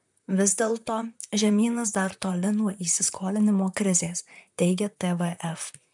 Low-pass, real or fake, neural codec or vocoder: 10.8 kHz; fake; vocoder, 44.1 kHz, 128 mel bands, Pupu-Vocoder